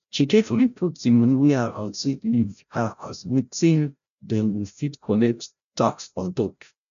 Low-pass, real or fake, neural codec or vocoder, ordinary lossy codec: 7.2 kHz; fake; codec, 16 kHz, 0.5 kbps, FreqCodec, larger model; none